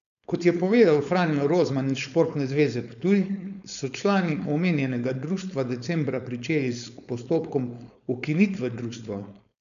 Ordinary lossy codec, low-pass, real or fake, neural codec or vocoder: none; 7.2 kHz; fake; codec, 16 kHz, 4.8 kbps, FACodec